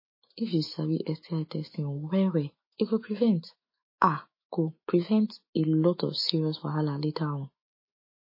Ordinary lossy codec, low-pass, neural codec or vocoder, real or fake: MP3, 24 kbps; 5.4 kHz; autoencoder, 48 kHz, 128 numbers a frame, DAC-VAE, trained on Japanese speech; fake